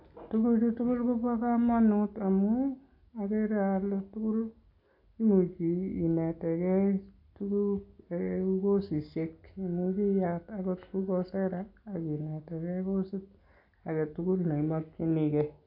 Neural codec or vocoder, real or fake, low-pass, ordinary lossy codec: none; real; 5.4 kHz; AAC, 48 kbps